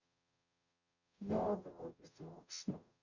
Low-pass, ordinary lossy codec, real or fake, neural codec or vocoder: 7.2 kHz; none; fake; codec, 44.1 kHz, 0.9 kbps, DAC